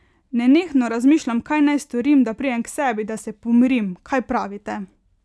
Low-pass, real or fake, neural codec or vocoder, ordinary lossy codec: none; real; none; none